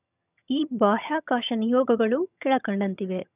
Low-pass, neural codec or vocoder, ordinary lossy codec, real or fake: 3.6 kHz; vocoder, 22.05 kHz, 80 mel bands, HiFi-GAN; none; fake